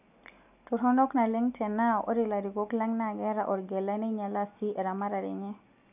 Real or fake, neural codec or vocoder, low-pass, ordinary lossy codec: real; none; 3.6 kHz; none